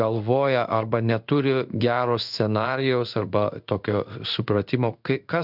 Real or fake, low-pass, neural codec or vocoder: fake; 5.4 kHz; codec, 16 kHz in and 24 kHz out, 1 kbps, XY-Tokenizer